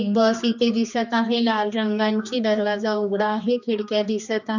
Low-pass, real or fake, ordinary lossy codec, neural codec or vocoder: 7.2 kHz; fake; none; codec, 16 kHz, 2 kbps, X-Codec, HuBERT features, trained on general audio